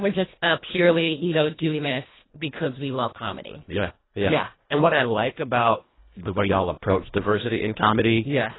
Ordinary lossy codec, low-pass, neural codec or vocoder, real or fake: AAC, 16 kbps; 7.2 kHz; codec, 24 kHz, 1.5 kbps, HILCodec; fake